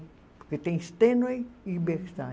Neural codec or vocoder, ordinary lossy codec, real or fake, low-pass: none; none; real; none